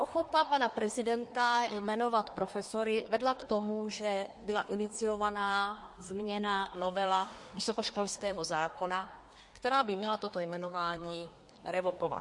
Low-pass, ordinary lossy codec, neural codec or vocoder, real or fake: 10.8 kHz; MP3, 48 kbps; codec, 24 kHz, 1 kbps, SNAC; fake